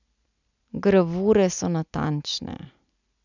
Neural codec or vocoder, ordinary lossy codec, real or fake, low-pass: none; none; real; 7.2 kHz